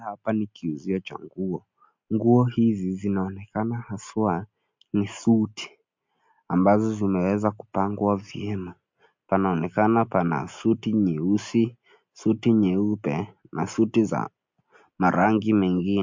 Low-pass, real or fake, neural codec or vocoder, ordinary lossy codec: 7.2 kHz; real; none; MP3, 48 kbps